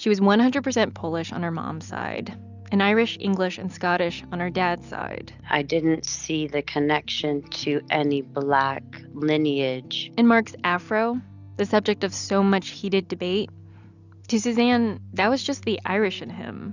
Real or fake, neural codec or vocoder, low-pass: real; none; 7.2 kHz